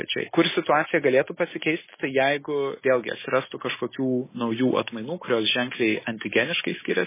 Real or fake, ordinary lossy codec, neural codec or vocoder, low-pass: real; MP3, 16 kbps; none; 3.6 kHz